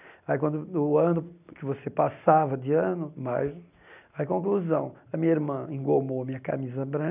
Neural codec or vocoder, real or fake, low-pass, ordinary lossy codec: none; real; 3.6 kHz; none